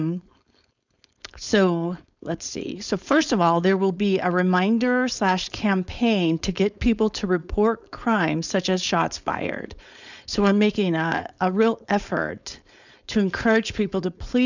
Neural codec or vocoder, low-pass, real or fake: codec, 16 kHz, 4.8 kbps, FACodec; 7.2 kHz; fake